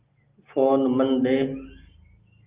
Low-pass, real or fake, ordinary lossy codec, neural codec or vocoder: 3.6 kHz; real; Opus, 24 kbps; none